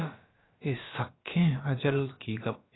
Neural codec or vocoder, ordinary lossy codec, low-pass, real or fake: codec, 16 kHz, about 1 kbps, DyCAST, with the encoder's durations; AAC, 16 kbps; 7.2 kHz; fake